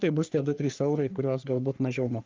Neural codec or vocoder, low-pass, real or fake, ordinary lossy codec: codec, 44.1 kHz, 3.4 kbps, Pupu-Codec; 7.2 kHz; fake; Opus, 24 kbps